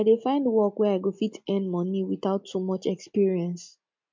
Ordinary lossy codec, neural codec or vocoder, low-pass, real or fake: MP3, 64 kbps; none; 7.2 kHz; real